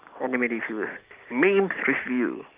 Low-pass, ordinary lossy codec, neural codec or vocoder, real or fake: 3.6 kHz; Opus, 32 kbps; none; real